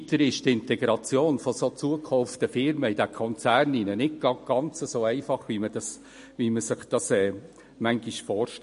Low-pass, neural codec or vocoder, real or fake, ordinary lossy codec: 10.8 kHz; none; real; MP3, 48 kbps